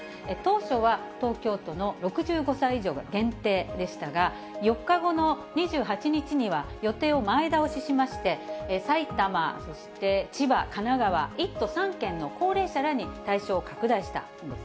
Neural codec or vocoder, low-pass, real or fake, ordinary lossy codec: none; none; real; none